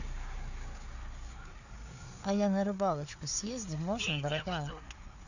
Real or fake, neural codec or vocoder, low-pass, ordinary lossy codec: fake; codec, 16 kHz, 4 kbps, FreqCodec, larger model; 7.2 kHz; none